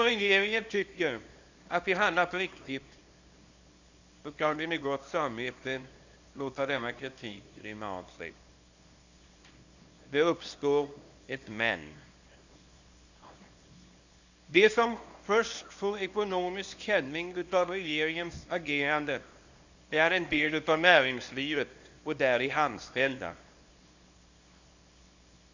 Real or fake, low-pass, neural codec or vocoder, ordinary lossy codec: fake; 7.2 kHz; codec, 24 kHz, 0.9 kbps, WavTokenizer, small release; Opus, 64 kbps